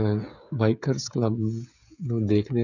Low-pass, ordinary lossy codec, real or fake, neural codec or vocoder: 7.2 kHz; none; fake; codec, 16 kHz, 16 kbps, FreqCodec, smaller model